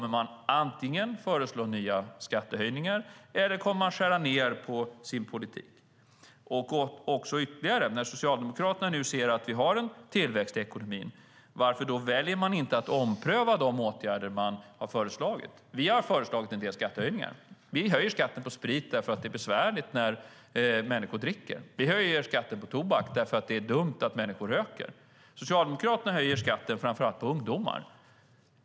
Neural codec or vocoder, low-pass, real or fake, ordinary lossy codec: none; none; real; none